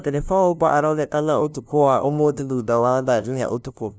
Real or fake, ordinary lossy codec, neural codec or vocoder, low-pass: fake; none; codec, 16 kHz, 0.5 kbps, FunCodec, trained on LibriTTS, 25 frames a second; none